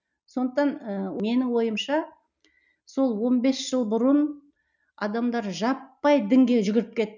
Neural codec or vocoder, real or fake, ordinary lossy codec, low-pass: none; real; none; 7.2 kHz